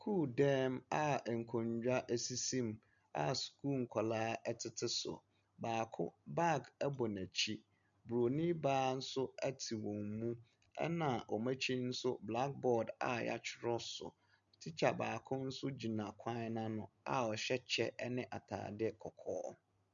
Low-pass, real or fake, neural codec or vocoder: 7.2 kHz; real; none